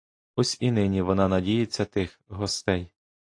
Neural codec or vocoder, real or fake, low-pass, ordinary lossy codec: none; real; 9.9 kHz; AAC, 48 kbps